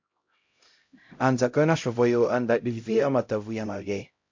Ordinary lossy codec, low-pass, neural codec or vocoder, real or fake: MP3, 48 kbps; 7.2 kHz; codec, 16 kHz, 0.5 kbps, X-Codec, HuBERT features, trained on LibriSpeech; fake